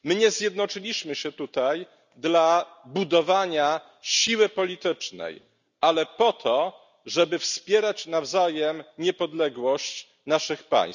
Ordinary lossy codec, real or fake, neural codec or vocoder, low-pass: none; real; none; 7.2 kHz